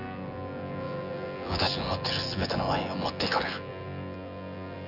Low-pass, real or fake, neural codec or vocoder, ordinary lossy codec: 5.4 kHz; fake; vocoder, 24 kHz, 100 mel bands, Vocos; none